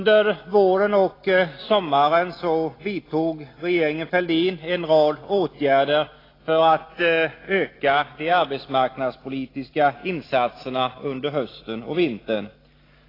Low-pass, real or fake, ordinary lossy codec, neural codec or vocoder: 5.4 kHz; real; AAC, 24 kbps; none